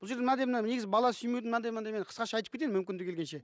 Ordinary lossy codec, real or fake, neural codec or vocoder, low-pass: none; real; none; none